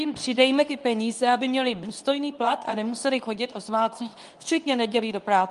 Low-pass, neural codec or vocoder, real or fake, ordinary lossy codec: 10.8 kHz; codec, 24 kHz, 0.9 kbps, WavTokenizer, medium speech release version 2; fake; Opus, 32 kbps